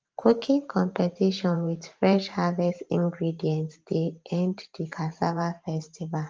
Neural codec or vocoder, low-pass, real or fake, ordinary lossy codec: vocoder, 44.1 kHz, 128 mel bands every 512 samples, BigVGAN v2; 7.2 kHz; fake; Opus, 24 kbps